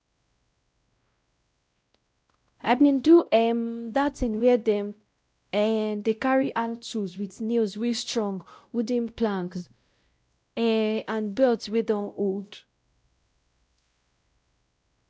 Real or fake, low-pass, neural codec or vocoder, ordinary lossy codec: fake; none; codec, 16 kHz, 0.5 kbps, X-Codec, WavLM features, trained on Multilingual LibriSpeech; none